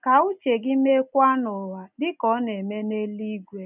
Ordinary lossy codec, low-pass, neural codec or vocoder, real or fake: none; 3.6 kHz; none; real